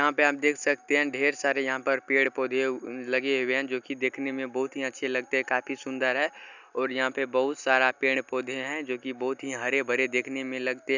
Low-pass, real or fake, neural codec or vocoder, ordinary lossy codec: 7.2 kHz; real; none; none